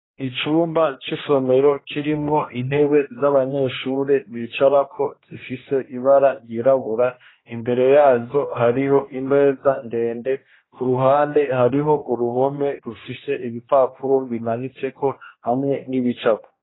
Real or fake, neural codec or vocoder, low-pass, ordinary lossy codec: fake; codec, 16 kHz, 1 kbps, X-Codec, HuBERT features, trained on general audio; 7.2 kHz; AAC, 16 kbps